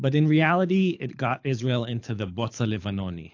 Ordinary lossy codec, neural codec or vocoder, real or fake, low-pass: AAC, 48 kbps; codec, 24 kHz, 6 kbps, HILCodec; fake; 7.2 kHz